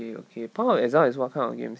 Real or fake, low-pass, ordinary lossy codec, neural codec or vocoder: real; none; none; none